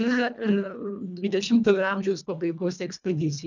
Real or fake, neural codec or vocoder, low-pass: fake; codec, 24 kHz, 1.5 kbps, HILCodec; 7.2 kHz